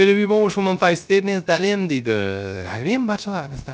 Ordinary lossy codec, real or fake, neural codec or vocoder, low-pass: none; fake; codec, 16 kHz, 0.3 kbps, FocalCodec; none